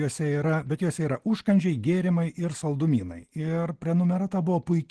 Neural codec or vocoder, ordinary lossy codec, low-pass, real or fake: none; Opus, 16 kbps; 10.8 kHz; real